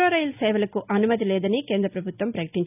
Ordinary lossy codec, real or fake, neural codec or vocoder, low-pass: none; real; none; 3.6 kHz